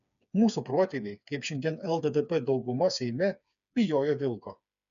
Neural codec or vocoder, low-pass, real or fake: codec, 16 kHz, 4 kbps, FreqCodec, smaller model; 7.2 kHz; fake